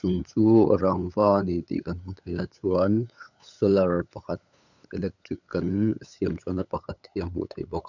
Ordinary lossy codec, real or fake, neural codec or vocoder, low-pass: none; fake; codec, 16 kHz, 8 kbps, FunCodec, trained on LibriTTS, 25 frames a second; 7.2 kHz